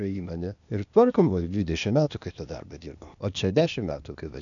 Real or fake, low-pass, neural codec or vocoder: fake; 7.2 kHz; codec, 16 kHz, 0.8 kbps, ZipCodec